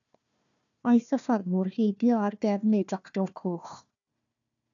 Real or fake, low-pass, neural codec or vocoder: fake; 7.2 kHz; codec, 16 kHz, 1 kbps, FunCodec, trained on Chinese and English, 50 frames a second